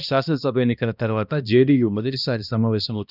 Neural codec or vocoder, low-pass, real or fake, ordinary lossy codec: codec, 16 kHz, 1 kbps, X-Codec, HuBERT features, trained on balanced general audio; 5.4 kHz; fake; none